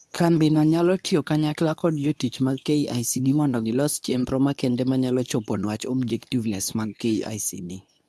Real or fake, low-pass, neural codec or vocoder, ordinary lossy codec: fake; none; codec, 24 kHz, 0.9 kbps, WavTokenizer, medium speech release version 2; none